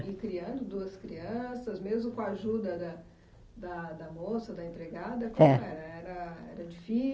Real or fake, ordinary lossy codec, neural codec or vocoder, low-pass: real; none; none; none